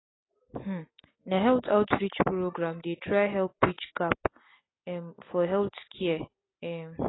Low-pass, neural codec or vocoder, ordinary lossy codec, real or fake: 7.2 kHz; none; AAC, 16 kbps; real